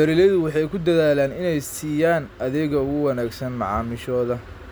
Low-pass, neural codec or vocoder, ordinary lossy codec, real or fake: none; none; none; real